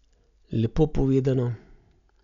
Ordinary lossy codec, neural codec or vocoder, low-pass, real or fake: none; none; 7.2 kHz; real